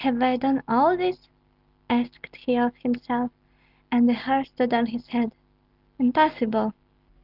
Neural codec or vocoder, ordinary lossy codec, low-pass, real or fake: none; Opus, 32 kbps; 5.4 kHz; real